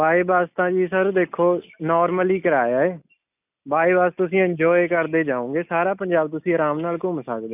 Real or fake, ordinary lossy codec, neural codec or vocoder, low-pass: real; none; none; 3.6 kHz